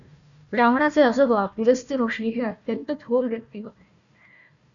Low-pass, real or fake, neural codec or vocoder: 7.2 kHz; fake; codec, 16 kHz, 1 kbps, FunCodec, trained on Chinese and English, 50 frames a second